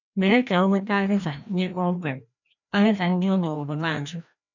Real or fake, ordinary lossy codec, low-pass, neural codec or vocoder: fake; none; 7.2 kHz; codec, 16 kHz, 1 kbps, FreqCodec, larger model